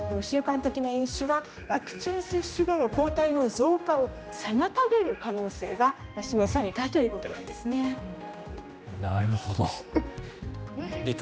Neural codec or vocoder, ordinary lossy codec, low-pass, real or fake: codec, 16 kHz, 1 kbps, X-Codec, HuBERT features, trained on general audio; none; none; fake